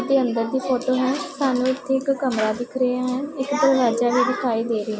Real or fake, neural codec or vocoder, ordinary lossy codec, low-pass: real; none; none; none